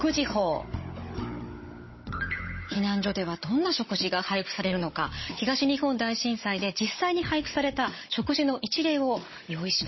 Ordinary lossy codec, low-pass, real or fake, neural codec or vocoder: MP3, 24 kbps; 7.2 kHz; fake; codec, 16 kHz, 16 kbps, FunCodec, trained on Chinese and English, 50 frames a second